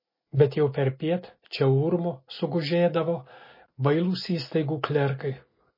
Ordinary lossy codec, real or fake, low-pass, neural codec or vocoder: MP3, 24 kbps; real; 5.4 kHz; none